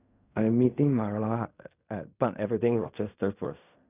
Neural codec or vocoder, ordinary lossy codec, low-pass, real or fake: codec, 16 kHz in and 24 kHz out, 0.4 kbps, LongCat-Audio-Codec, fine tuned four codebook decoder; none; 3.6 kHz; fake